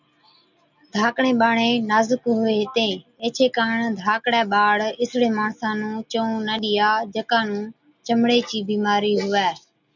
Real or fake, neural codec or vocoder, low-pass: real; none; 7.2 kHz